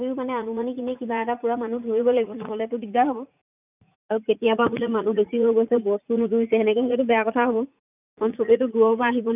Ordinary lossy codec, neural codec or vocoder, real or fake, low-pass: none; vocoder, 44.1 kHz, 80 mel bands, Vocos; fake; 3.6 kHz